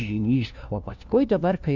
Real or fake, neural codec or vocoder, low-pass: fake; codec, 16 kHz, 1 kbps, FunCodec, trained on LibriTTS, 50 frames a second; 7.2 kHz